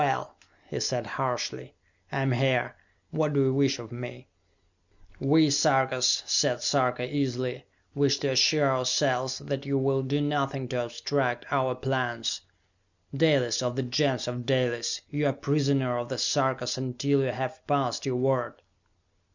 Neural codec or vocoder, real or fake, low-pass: none; real; 7.2 kHz